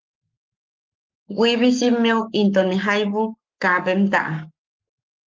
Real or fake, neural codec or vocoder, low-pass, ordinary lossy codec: fake; codec, 44.1 kHz, 7.8 kbps, Pupu-Codec; 7.2 kHz; Opus, 32 kbps